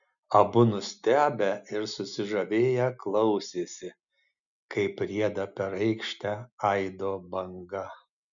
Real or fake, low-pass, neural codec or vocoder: real; 7.2 kHz; none